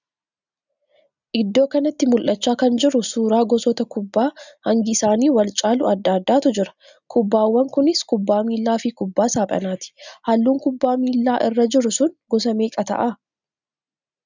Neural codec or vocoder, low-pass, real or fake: none; 7.2 kHz; real